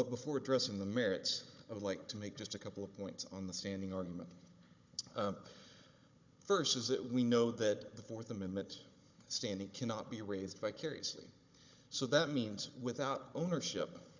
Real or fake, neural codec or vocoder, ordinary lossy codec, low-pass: fake; codec, 16 kHz, 16 kbps, FunCodec, trained on Chinese and English, 50 frames a second; MP3, 64 kbps; 7.2 kHz